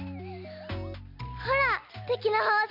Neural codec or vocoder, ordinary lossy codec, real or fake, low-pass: none; none; real; 5.4 kHz